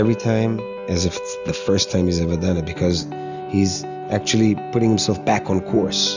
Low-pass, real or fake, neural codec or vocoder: 7.2 kHz; real; none